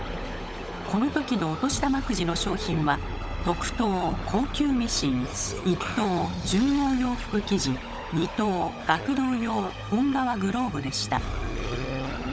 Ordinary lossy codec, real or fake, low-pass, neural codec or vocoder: none; fake; none; codec, 16 kHz, 16 kbps, FunCodec, trained on LibriTTS, 50 frames a second